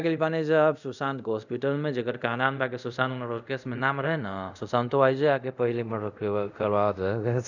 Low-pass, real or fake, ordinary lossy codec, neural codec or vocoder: 7.2 kHz; fake; none; codec, 24 kHz, 0.5 kbps, DualCodec